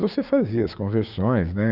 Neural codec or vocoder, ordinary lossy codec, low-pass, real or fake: codec, 16 kHz, 6 kbps, DAC; Opus, 64 kbps; 5.4 kHz; fake